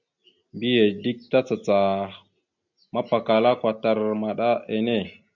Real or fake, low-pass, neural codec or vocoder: real; 7.2 kHz; none